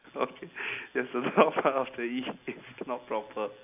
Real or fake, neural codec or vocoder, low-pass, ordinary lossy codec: real; none; 3.6 kHz; AAC, 32 kbps